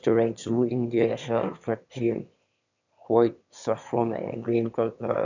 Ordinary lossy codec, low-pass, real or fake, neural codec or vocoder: none; 7.2 kHz; fake; autoencoder, 22.05 kHz, a latent of 192 numbers a frame, VITS, trained on one speaker